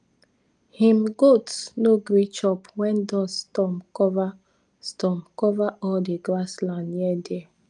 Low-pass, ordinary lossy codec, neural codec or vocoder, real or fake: 9.9 kHz; Opus, 32 kbps; none; real